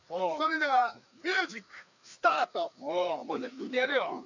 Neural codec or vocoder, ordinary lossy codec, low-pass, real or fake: codec, 16 kHz, 2 kbps, FreqCodec, larger model; none; 7.2 kHz; fake